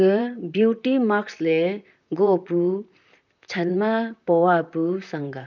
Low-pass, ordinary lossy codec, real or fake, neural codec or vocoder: 7.2 kHz; none; fake; vocoder, 44.1 kHz, 128 mel bands, Pupu-Vocoder